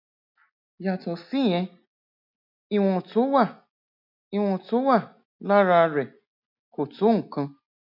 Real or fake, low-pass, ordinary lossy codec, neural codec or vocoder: real; 5.4 kHz; none; none